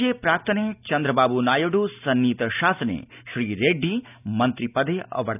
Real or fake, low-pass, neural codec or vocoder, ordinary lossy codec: real; 3.6 kHz; none; none